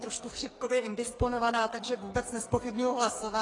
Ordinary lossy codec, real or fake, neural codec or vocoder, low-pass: AAC, 32 kbps; fake; codec, 44.1 kHz, 2.6 kbps, SNAC; 10.8 kHz